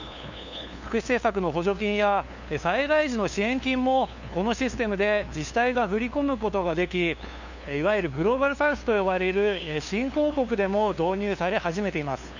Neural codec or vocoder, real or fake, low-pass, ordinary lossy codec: codec, 16 kHz, 2 kbps, FunCodec, trained on LibriTTS, 25 frames a second; fake; 7.2 kHz; none